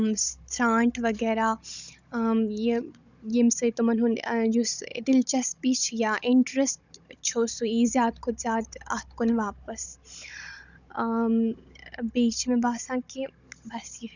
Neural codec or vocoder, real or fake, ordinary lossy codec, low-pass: codec, 16 kHz, 16 kbps, FunCodec, trained on Chinese and English, 50 frames a second; fake; none; 7.2 kHz